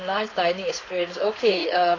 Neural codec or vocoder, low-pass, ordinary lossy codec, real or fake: codec, 16 kHz, 4.8 kbps, FACodec; 7.2 kHz; AAC, 32 kbps; fake